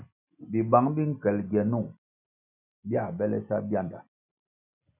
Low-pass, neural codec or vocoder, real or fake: 3.6 kHz; none; real